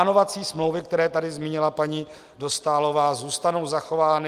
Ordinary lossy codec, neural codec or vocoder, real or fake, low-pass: Opus, 32 kbps; none; real; 14.4 kHz